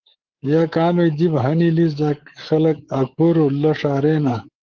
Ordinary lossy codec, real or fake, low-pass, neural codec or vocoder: Opus, 16 kbps; fake; 7.2 kHz; codec, 24 kHz, 3.1 kbps, DualCodec